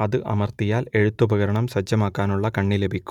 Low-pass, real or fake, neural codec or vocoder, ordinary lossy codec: 19.8 kHz; real; none; none